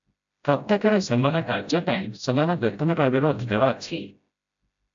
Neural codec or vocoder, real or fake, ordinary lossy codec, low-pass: codec, 16 kHz, 0.5 kbps, FreqCodec, smaller model; fake; AAC, 64 kbps; 7.2 kHz